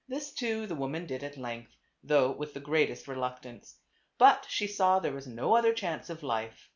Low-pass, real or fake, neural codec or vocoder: 7.2 kHz; real; none